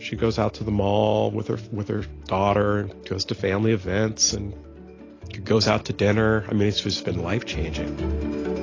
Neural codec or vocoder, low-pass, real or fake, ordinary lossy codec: none; 7.2 kHz; real; AAC, 32 kbps